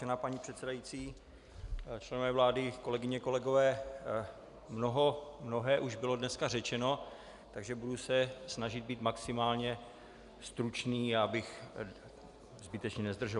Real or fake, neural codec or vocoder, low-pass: real; none; 10.8 kHz